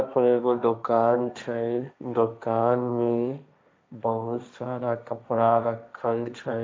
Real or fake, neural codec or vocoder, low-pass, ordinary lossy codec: fake; codec, 16 kHz, 1.1 kbps, Voila-Tokenizer; none; none